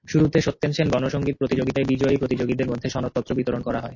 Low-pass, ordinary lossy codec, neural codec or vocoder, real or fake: 7.2 kHz; MP3, 32 kbps; none; real